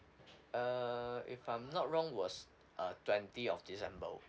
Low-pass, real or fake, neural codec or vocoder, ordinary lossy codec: none; real; none; none